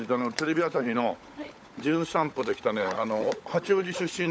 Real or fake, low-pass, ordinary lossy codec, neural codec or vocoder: fake; none; none; codec, 16 kHz, 16 kbps, FunCodec, trained on LibriTTS, 50 frames a second